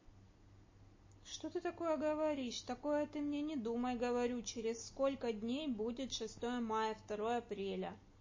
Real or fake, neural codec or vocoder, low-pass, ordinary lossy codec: real; none; 7.2 kHz; MP3, 32 kbps